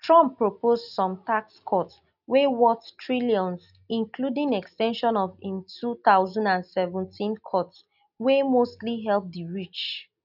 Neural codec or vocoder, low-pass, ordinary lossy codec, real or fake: none; 5.4 kHz; none; real